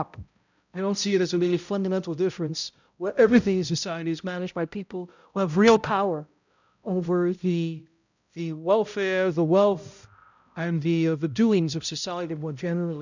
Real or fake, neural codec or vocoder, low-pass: fake; codec, 16 kHz, 0.5 kbps, X-Codec, HuBERT features, trained on balanced general audio; 7.2 kHz